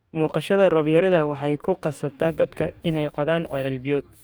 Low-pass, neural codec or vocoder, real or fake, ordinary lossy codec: none; codec, 44.1 kHz, 2.6 kbps, DAC; fake; none